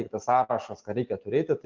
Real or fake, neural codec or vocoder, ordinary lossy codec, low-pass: real; none; Opus, 32 kbps; 7.2 kHz